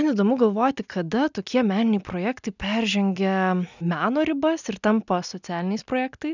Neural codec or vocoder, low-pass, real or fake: none; 7.2 kHz; real